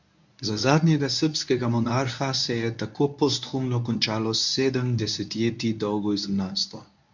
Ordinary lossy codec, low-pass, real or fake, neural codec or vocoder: MP3, 64 kbps; 7.2 kHz; fake; codec, 24 kHz, 0.9 kbps, WavTokenizer, medium speech release version 1